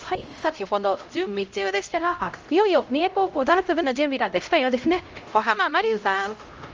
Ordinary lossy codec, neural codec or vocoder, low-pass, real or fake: Opus, 24 kbps; codec, 16 kHz, 0.5 kbps, X-Codec, HuBERT features, trained on LibriSpeech; 7.2 kHz; fake